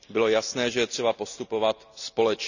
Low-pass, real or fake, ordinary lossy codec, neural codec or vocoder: 7.2 kHz; real; none; none